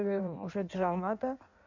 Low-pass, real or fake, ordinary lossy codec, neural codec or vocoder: 7.2 kHz; fake; none; codec, 16 kHz in and 24 kHz out, 1.1 kbps, FireRedTTS-2 codec